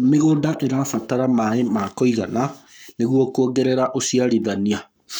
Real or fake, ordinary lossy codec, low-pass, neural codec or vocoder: fake; none; none; codec, 44.1 kHz, 7.8 kbps, Pupu-Codec